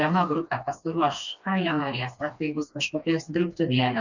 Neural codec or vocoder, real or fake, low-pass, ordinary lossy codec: codec, 16 kHz, 2 kbps, FreqCodec, smaller model; fake; 7.2 kHz; Opus, 64 kbps